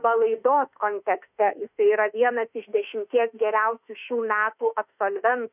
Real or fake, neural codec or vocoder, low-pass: fake; autoencoder, 48 kHz, 32 numbers a frame, DAC-VAE, trained on Japanese speech; 3.6 kHz